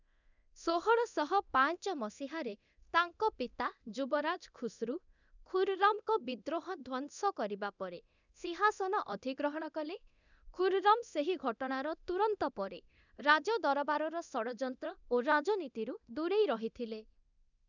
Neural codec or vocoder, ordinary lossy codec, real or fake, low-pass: codec, 24 kHz, 0.9 kbps, DualCodec; none; fake; 7.2 kHz